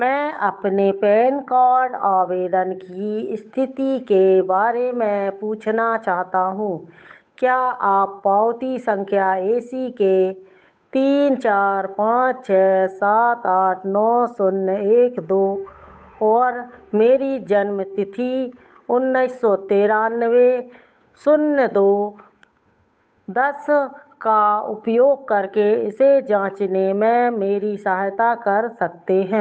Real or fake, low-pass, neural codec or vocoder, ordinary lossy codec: fake; none; codec, 16 kHz, 8 kbps, FunCodec, trained on Chinese and English, 25 frames a second; none